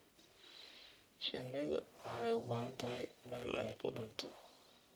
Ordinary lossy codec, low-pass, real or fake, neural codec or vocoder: none; none; fake; codec, 44.1 kHz, 1.7 kbps, Pupu-Codec